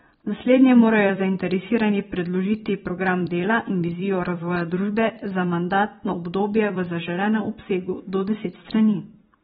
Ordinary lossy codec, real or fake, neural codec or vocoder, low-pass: AAC, 16 kbps; fake; vocoder, 44.1 kHz, 128 mel bands every 256 samples, BigVGAN v2; 19.8 kHz